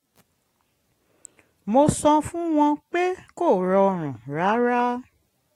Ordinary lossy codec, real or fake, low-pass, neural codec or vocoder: AAC, 48 kbps; real; 19.8 kHz; none